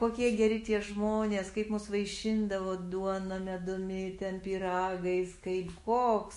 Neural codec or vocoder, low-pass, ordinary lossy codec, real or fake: autoencoder, 48 kHz, 128 numbers a frame, DAC-VAE, trained on Japanese speech; 14.4 kHz; MP3, 48 kbps; fake